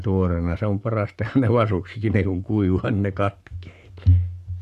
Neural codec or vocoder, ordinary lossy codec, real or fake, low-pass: codec, 44.1 kHz, 7.8 kbps, Pupu-Codec; none; fake; 14.4 kHz